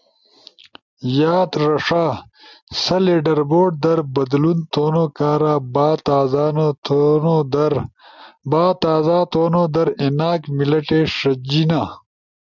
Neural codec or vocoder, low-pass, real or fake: none; 7.2 kHz; real